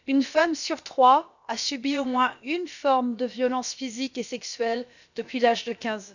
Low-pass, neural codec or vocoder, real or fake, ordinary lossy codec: 7.2 kHz; codec, 16 kHz, about 1 kbps, DyCAST, with the encoder's durations; fake; none